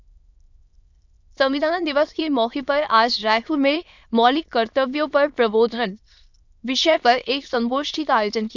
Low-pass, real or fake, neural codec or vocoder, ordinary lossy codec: 7.2 kHz; fake; autoencoder, 22.05 kHz, a latent of 192 numbers a frame, VITS, trained on many speakers; none